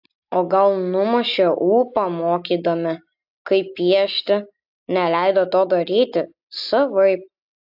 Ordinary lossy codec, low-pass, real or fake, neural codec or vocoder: AAC, 48 kbps; 5.4 kHz; real; none